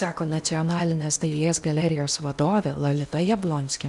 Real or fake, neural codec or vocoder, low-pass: fake; codec, 16 kHz in and 24 kHz out, 0.8 kbps, FocalCodec, streaming, 65536 codes; 10.8 kHz